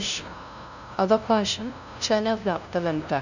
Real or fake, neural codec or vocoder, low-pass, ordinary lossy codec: fake; codec, 16 kHz, 0.5 kbps, FunCodec, trained on LibriTTS, 25 frames a second; 7.2 kHz; none